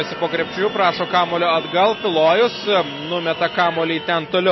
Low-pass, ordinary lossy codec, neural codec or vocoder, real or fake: 7.2 kHz; MP3, 24 kbps; none; real